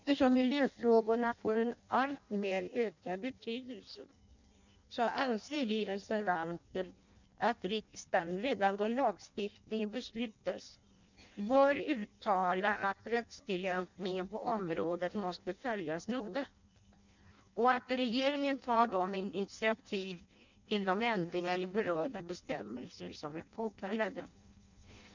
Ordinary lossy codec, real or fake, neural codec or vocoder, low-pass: none; fake; codec, 16 kHz in and 24 kHz out, 0.6 kbps, FireRedTTS-2 codec; 7.2 kHz